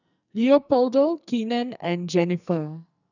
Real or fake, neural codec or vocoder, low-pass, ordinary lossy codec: fake; codec, 44.1 kHz, 2.6 kbps, SNAC; 7.2 kHz; none